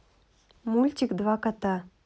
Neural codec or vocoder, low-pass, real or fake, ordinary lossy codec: none; none; real; none